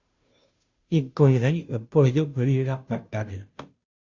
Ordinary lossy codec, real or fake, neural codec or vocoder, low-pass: Opus, 64 kbps; fake; codec, 16 kHz, 0.5 kbps, FunCodec, trained on Chinese and English, 25 frames a second; 7.2 kHz